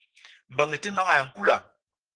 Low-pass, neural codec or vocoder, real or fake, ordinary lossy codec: 9.9 kHz; codec, 32 kHz, 1.9 kbps, SNAC; fake; Opus, 16 kbps